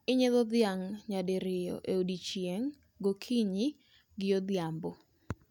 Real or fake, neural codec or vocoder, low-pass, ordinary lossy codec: real; none; 19.8 kHz; none